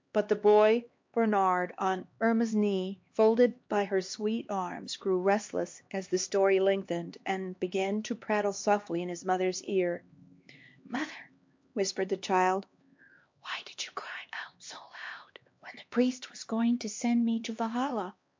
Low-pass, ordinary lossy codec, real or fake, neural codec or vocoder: 7.2 kHz; MP3, 48 kbps; fake; codec, 16 kHz, 2 kbps, X-Codec, HuBERT features, trained on LibriSpeech